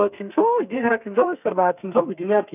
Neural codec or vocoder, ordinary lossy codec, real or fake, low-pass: codec, 32 kHz, 1.9 kbps, SNAC; none; fake; 3.6 kHz